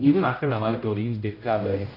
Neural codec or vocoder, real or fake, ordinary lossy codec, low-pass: codec, 16 kHz, 0.5 kbps, X-Codec, HuBERT features, trained on general audio; fake; none; 5.4 kHz